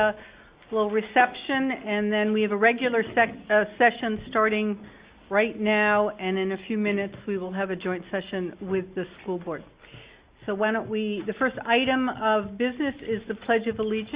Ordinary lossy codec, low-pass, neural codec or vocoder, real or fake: Opus, 64 kbps; 3.6 kHz; none; real